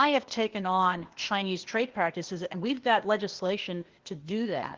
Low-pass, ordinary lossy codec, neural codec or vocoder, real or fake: 7.2 kHz; Opus, 16 kbps; codec, 16 kHz, 0.8 kbps, ZipCodec; fake